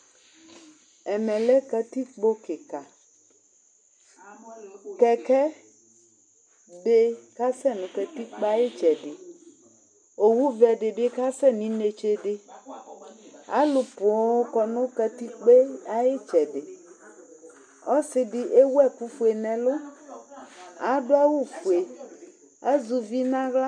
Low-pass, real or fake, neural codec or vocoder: 9.9 kHz; real; none